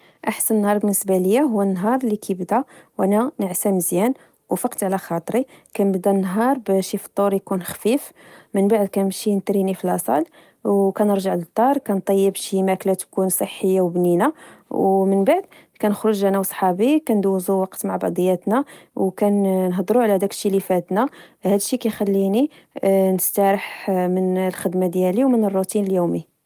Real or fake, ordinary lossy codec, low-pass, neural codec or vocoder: real; Opus, 32 kbps; 14.4 kHz; none